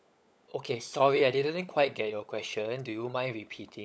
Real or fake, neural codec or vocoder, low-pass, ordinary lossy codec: fake; codec, 16 kHz, 16 kbps, FunCodec, trained on LibriTTS, 50 frames a second; none; none